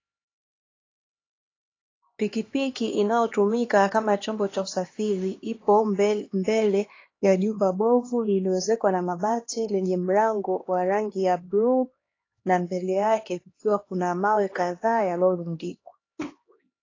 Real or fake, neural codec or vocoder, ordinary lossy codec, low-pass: fake; codec, 16 kHz, 2 kbps, X-Codec, HuBERT features, trained on LibriSpeech; AAC, 32 kbps; 7.2 kHz